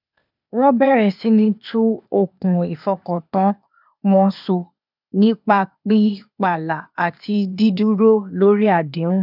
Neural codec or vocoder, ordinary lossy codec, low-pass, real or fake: codec, 16 kHz, 0.8 kbps, ZipCodec; none; 5.4 kHz; fake